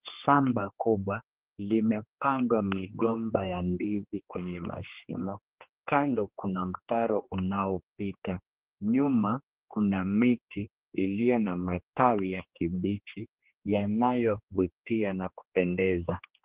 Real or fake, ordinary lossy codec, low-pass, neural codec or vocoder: fake; Opus, 16 kbps; 3.6 kHz; codec, 16 kHz, 2 kbps, X-Codec, HuBERT features, trained on general audio